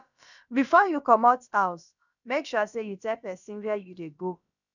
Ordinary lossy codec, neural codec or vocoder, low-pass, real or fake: none; codec, 16 kHz, about 1 kbps, DyCAST, with the encoder's durations; 7.2 kHz; fake